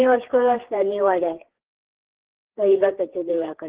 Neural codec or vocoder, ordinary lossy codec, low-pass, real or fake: codec, 16 kHz, 4 kbps, FreqCodec, larger model; Opus, 16 kbps; 3.6 kHz; fake